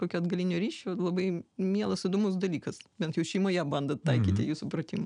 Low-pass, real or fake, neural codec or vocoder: 9.9 kHz; real; none